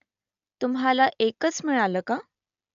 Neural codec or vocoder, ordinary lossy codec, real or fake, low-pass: none; none; real; 7.2 kHz